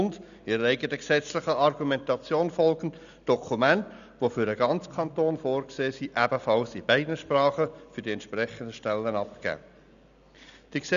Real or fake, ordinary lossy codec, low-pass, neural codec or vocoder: real; none; 7.2 kHz; none